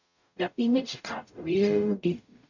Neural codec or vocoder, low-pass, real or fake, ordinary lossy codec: codec, 44.1 kHz, 0.9 kbps, DAC; 7.2 kHz; fake; none